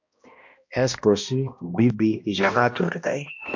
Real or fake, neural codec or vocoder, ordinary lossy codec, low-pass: fake; codec, 16 kHz, 1 kbps, X-Codec, HuBERT features, trained on balanced general audio; MP3, 48 kbps; 7.2 kHz